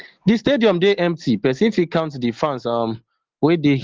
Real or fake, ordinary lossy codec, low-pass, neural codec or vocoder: real; Opus, 16 kbps; 7.2 kHz; none